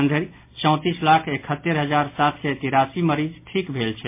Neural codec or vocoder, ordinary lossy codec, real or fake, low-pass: none; MP3, 24 kbps; real; 3.6 kHz